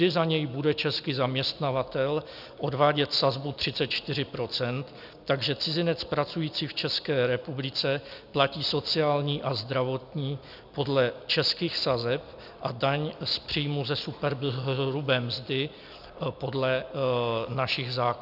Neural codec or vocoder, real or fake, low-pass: none; real; 5.4 kHz